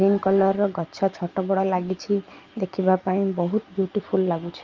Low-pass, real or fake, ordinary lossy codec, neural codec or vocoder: 7.2 kHz; real; Opus, 24 kbps; none